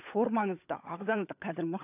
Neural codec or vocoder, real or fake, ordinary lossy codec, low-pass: codec, 16 kHz, 16 kbps, FunCodec, trained on LibriTTS, 50 frames a second; fake; none; 3.6 kHz